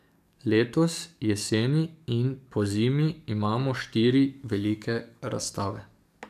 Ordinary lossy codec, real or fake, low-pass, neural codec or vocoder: none; fake; 14.4 kHz; codec, 44.1 kHz, 7.8 kbps, DAC